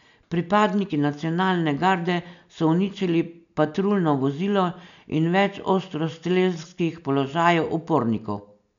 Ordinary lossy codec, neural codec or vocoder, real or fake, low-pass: none; none; real; 7.2 kHz